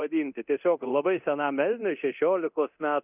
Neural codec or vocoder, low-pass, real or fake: codec, 24 kHz, 0.9 kbps, DualCodec; 3.6 kHz; fake